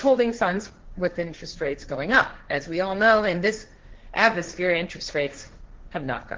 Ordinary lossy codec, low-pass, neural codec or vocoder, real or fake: Opus, 16 kbps; 7.2 kHz; codec, 16 kHz, 1.1 kbps, Voila-Tokenizer; fake